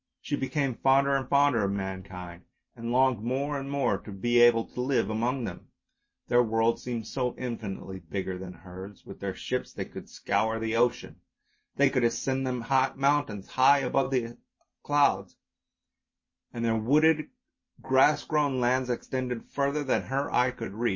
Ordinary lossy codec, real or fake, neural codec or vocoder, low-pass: MP3, 32 kbps; real; none; 7.2 kHz